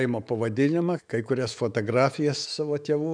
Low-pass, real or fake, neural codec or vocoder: 9.9 kHz; fake; autoencoder, 48 kHz, 128 numbers a frame, DAC-VAE, trained on Japanese speech